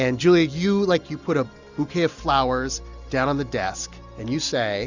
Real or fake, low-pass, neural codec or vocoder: real; 7.2 kHz; none